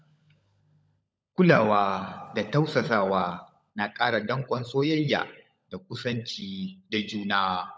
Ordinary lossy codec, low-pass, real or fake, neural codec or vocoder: none; none; fake; codec, 16 kHz, 16 kbps, FunCodec, trained on LibriTTS, 50 frames a second